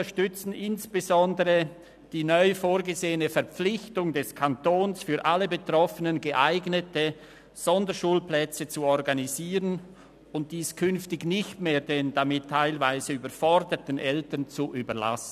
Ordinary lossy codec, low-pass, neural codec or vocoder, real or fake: none; 14.4 kHz; none; real